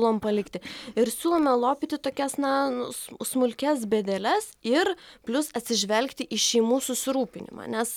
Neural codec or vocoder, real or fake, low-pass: none; real; 19.8 kHz